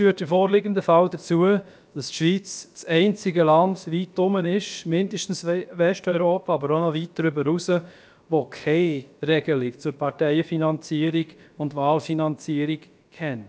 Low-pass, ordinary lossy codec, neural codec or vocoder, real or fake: none; none; codec, 16 kHz, about 1 kbps, DyCAST, with the encoder's durations; fake